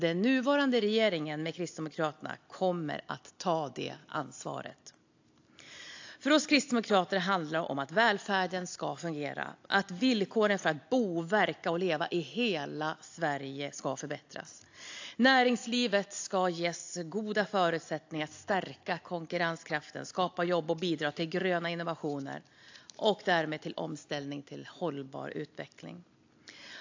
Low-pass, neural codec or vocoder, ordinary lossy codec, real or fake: 7.2 kHz; none; AAC, 48 kbps; real